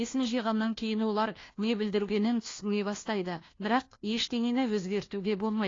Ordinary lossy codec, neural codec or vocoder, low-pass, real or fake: AAC, 32 kbps; codec, 16 kHz, 1 kbps, FunCodec, trained on LibriTTS, 50 frames a second; 7.2 kHz; fake